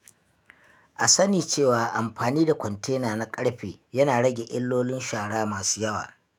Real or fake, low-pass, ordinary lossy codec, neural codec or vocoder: fake; 19.8 kHz; none; autoencoder, 48 kHz, 128 numbers a frame, DAC-VAE, trained on Japanese speech